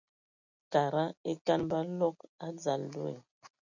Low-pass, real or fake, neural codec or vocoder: 7.2 kHz; real; none